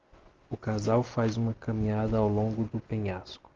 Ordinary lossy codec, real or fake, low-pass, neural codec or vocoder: Opus, 32 kbps; real; 7.2 kHz; none